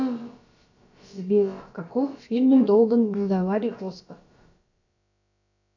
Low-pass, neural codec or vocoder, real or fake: 7.2 kHz; codec, 16 kHz, about 1 kbps, DyCAST, with the encoder's durations; fake